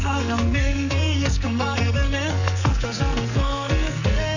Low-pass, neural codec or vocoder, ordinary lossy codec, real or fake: 7.2 kHz; codec, 44.1 kHz, 2.6 kbps, SNAC; none; fake